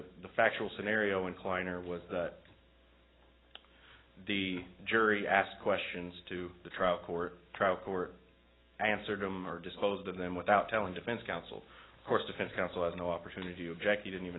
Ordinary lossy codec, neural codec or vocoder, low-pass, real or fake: AAC, 16 kbps; none; 7.2 kHz; real